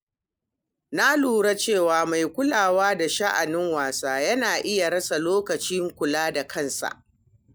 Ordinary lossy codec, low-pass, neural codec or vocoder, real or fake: none; none; none; real